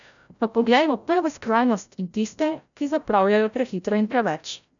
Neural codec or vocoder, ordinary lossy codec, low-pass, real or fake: codec, 16 kHz, 0.5 kbps, FreqCodec, larger model; none; 7.2 kHz; fake